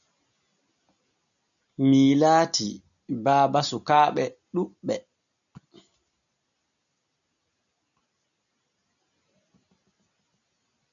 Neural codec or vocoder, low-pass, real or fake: none; 7.2 kHz; real